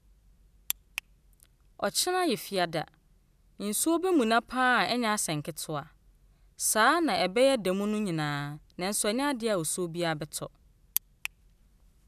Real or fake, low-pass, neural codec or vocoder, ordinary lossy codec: real; 14.4 kHz; none; none